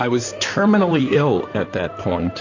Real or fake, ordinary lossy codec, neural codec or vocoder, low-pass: fake; AAC, 48 kbps; codec, 16 kHz in and 24 kHz out, 2.2 kbps, FireRedTTS-2 codec; 7.2 kHz